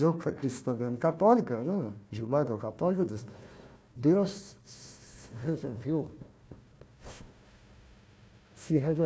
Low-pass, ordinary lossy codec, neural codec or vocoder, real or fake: none; none; codec, 16 kHz, 1 kbps, FunCodec, trained on Chinese and English, 50 frames a second; fake